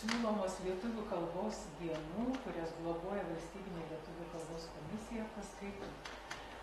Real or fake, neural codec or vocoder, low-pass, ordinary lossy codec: real; none; 19.8 kHz; AAC, 32 kbps